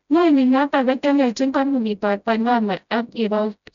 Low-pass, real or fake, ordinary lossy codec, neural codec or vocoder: 7.2 kHz; fake; none; codec, 16 kHz, 0.5 kbps, FreqCodec, smaller model